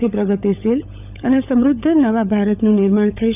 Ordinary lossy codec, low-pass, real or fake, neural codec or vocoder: none; 3.6 kHz; fake; codec, 16 kHz, 16 kbps, FunCodec, trained on LibriTTS, 50 frames a second